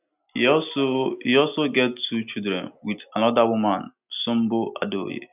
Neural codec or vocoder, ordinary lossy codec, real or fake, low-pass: none; none; real; 3.6 kHz